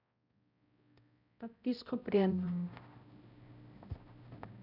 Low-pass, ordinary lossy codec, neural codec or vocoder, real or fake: 5.4 kHz; none; codec, 16 kHz, 0.5 kbps, X-Codec, HuBERT features, trained on balanced general audio; fake